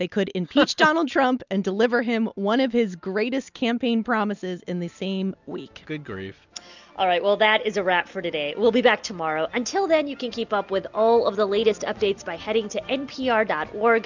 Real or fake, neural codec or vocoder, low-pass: real; none; 7.2 kHz